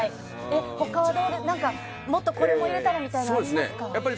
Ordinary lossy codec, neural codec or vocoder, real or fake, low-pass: none; none; real; none